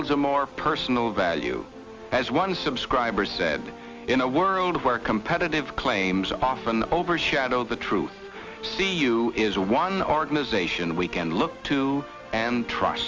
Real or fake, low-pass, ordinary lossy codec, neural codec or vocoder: real; 7.2 kHz; Opus, 32 kbps; none